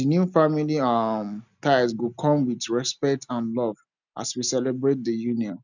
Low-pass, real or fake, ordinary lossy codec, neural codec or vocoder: 7.2 kHz; real; none; none